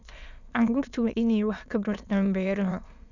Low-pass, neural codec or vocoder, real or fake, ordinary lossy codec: 7.2 kHz; autoencoder, 22.05 kHz, a latent of 192 numbers a frame, VITS, trained on many speakers; fake; none